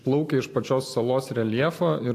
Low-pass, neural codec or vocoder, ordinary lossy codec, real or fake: 14.4 kHz; none; AAC, 64 kbps; real